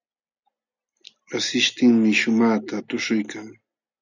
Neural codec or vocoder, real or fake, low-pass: none; real; 7.2 kHz